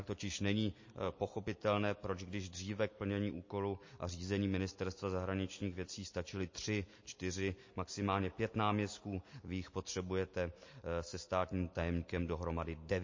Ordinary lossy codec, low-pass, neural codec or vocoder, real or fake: MP3, 32 kbps; 7.2 kHz; none; real